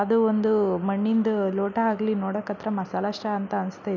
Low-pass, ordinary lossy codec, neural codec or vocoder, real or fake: 7.2 kHz; none; none; real